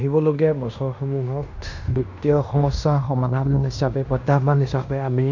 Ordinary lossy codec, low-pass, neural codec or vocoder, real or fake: none; 7.2 kHz; codec, 16 kHz in and 24 kHz out, 0.9 kbps, LongCat-Audio-Codec, fine tuned four codebook decoder; fake